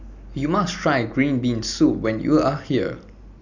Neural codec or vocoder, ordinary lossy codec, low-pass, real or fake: none; none; 7.2 kHz; real